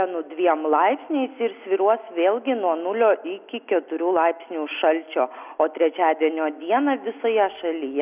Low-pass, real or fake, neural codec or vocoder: 3.6 kHz; real; none